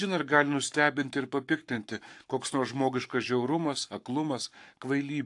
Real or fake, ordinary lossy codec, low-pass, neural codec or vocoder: fake; AAC, 64 kbps; 10.8 kHz; codec, 44.1 kHz, 7.8 kbps, Pupu-Codec